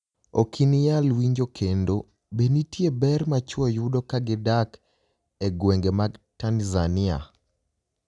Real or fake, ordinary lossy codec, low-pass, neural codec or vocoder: real; none; 10.8 kHz; none